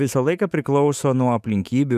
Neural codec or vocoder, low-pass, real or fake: autoencoder, 48 kHz, 128 numbers a frame, DAC-VAE, trained on Japanese speech; 14.4 kHz; fake